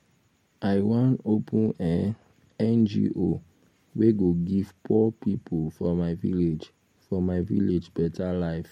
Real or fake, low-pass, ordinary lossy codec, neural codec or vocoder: real; 19.8 kHz; MP3, 64 kbps; none